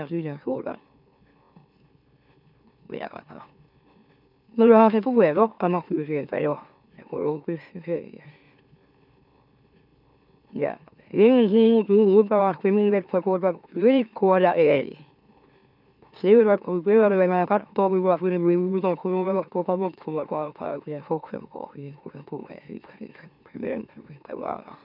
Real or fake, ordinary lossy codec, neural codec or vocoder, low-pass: fake; none; autoencoder, 44.1 kHz, a latent of 192 numbers a frame, MeloTTS; 5.4 kHz